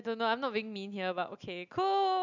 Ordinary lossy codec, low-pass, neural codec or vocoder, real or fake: none; 7.2 kHz; none; real